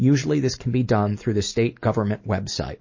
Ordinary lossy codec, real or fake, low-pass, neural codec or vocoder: MP3, 32 kbps; real; 7.2 kHz; none